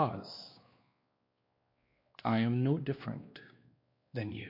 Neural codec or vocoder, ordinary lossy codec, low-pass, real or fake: codec, 16 kHz, 2 kbps, X-Codec, WavLM features, trained on Multilingual LibriSpeech; MP3, 32 kbps; 5.4 kHz; fake